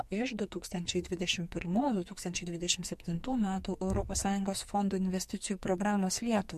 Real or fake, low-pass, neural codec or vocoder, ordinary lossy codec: fake; 14.4 kHz; codec, 44.1 kHz, 2.6 kbps, SNAC; MP3, 64 kbps